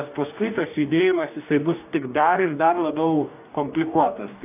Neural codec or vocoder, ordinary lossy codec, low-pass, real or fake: codec, 44.1 kHz, 2.6 kbps, DAC; AAC, 32 kbps; 3.6 kHz; fake